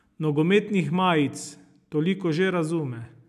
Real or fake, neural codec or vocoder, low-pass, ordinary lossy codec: real; none; 14.4 kHz; none